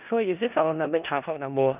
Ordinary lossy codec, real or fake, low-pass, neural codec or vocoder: none; fake; 3.6 kHz; codec, 16 kHz in and 24 kHz out, 0.4 kbps, LongCat-Audio-Codec, four codebook decoder